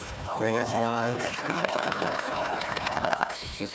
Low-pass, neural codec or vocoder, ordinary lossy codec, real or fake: none; codec, 16 kHz, 1 kbps, FunCodec, trained on Chinese and English, 50 frames a second; none; fake